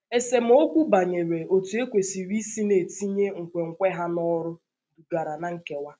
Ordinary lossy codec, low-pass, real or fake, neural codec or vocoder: none; none; real; none